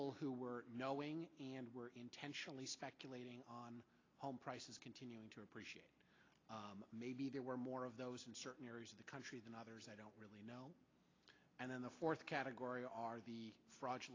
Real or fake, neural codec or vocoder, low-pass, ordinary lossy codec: real; none; 7.2 kHz; AAC, 32 kbps